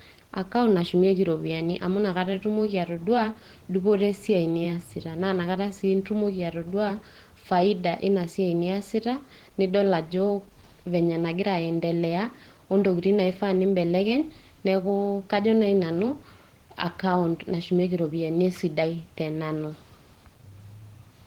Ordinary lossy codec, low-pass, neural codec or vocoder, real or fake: Opus, 16 kbps; 19.8 kHz; vocoder, 44.1 kHz, 128 mel bands every 512 samples, BigVGAN v2; fake